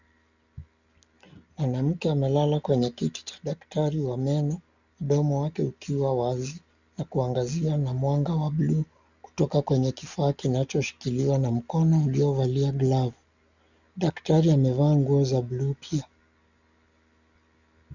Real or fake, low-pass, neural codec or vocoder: real; 7.2 kHz; none